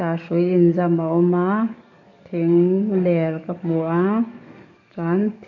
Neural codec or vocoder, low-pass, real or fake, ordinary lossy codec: codec, 44.1 kHz, 7.8 kbps, DAC; 7.2 kHz; fake; none